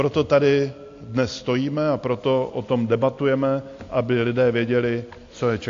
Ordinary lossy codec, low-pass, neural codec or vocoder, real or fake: AAC, 48 kbps; 7.2 kHz; none; real